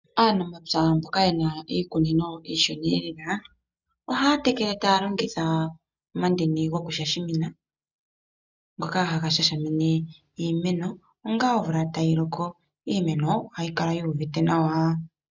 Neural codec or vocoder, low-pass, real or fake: none; 7.2 kHz; real